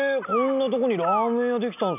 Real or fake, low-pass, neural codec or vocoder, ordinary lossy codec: real; 3.6 kHz; none; none